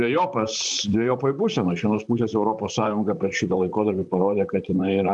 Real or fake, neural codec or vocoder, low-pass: fake; vocoder, 44.1 kHz, 128 mel bands, Pupu-Vocoder; 10.8 kHz